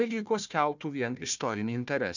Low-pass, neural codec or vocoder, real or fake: 7.2 kHz; codec, 16 kHz, 1 kbps, FunCodec, trained on Chinese and English, 50 frames a second; fake